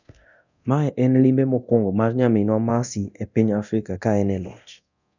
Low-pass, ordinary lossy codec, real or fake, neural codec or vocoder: 7.2 kHz; none; fake; codec, 24 kHz, 0.9 kbps, DualCodec